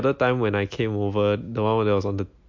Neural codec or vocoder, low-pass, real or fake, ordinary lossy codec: none; 7.2 kHz; real; MP3, 48 kbps